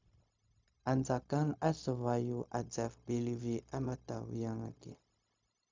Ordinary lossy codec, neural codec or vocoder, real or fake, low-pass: MP3, 64 kbps; codec, 16 kHz, 0.4 kbps, LongCat-Audio-Codec; fake; 7.2 kHz